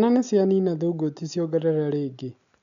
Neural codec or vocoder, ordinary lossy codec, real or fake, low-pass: none; MP3, 96 kbps; real; 7.2 kHz